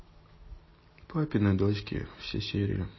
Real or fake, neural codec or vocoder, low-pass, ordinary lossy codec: real; none; 7.2 kHz; MP3, 24 kbps